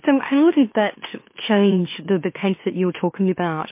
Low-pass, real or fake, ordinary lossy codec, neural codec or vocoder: 3.6 kHz; fake; MP3, 24 kbps; autoencoder, 44.1 kHz, a latent of 192 numbers a frame, MeloTTS